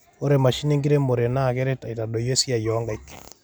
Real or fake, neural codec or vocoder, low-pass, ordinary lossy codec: real; none; none; none